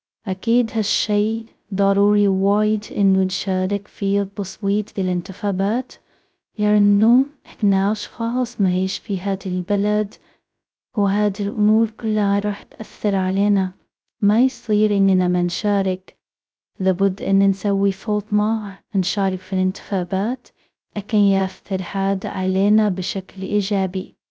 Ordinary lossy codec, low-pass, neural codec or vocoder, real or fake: none; none; codec, 16 kHz, 0.2 kbps, FocalCodec; fake